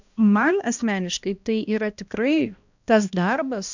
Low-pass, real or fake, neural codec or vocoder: 7.2 kHz; fake; codec, 16 kHz, 1 kbps, X-Codec, HuBERT features, trained on balanced general audio